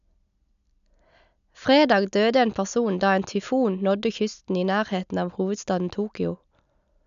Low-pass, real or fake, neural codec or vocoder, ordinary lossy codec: 7.2 kHz; real; none; none